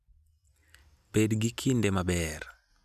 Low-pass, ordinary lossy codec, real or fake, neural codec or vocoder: 14.4 kHz; none; real; none